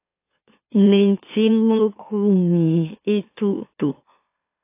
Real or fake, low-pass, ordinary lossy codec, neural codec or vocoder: fake; 3.6 kHz; AAC, 24 kbps; autoencoder, 44.1 kHz, a latent of 192 numbers a frame, MeloTTS